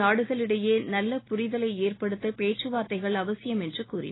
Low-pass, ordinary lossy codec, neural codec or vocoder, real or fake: 7.2 kHz; AAC, 16 kbps; none; real